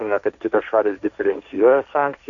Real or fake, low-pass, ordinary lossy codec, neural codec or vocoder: fake; 7.2 kHz; MP3, 96 kbps; codec, 16 kHz, 1.1 kbps, Voila-Tokenizer